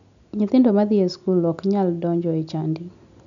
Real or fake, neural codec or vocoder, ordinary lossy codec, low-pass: real; none; none; 7.2 kHz